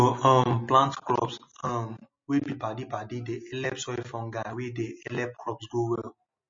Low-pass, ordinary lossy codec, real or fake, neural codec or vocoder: 7.2 kHz; MP3, 32 kbps; real; none